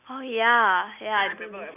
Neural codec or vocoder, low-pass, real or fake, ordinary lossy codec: none; 3.6 kHz; real; none